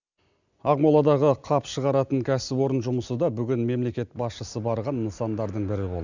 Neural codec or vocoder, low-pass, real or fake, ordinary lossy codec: none; 7.2 kHz; real; none